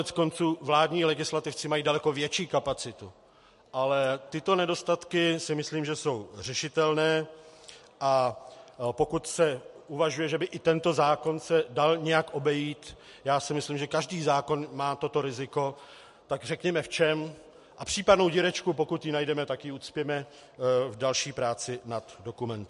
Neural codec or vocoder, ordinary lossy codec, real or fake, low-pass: none; MP3, 48 kbps; real; 14.4 kHz